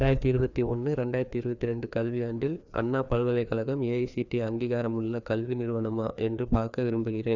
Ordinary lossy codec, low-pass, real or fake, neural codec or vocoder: none; 7.2 kHz; fake; codec, 16 kHz, 2 kbps, FreqCodec, larger model